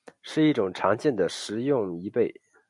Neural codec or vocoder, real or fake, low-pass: none; real; 10.8 kHz